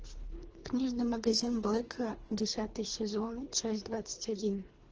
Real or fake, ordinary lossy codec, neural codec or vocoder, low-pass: fake; Opus, 16 kbps; codec, 24 kHz, 3 kbps, HILCodec; 7.2 kHz